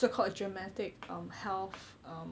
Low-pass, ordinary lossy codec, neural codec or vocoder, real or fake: none; none; none; real